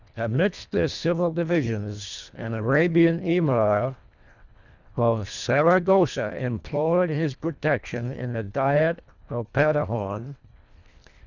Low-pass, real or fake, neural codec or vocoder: 7.2 kHz; fake; codec, 24 kHz, 1.5 kbps, HILCodec